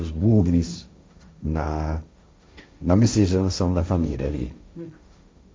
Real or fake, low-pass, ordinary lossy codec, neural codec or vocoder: fake; none; none; codec, 16 kHz, 1.1 kbps, Voila-Tokenizer